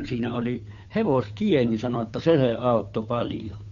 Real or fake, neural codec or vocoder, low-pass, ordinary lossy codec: fake; codec, 16 kHz, 2 kbps, FunCodec, trained on Chinese and English, 25 frames a second; 7.2 kHz; none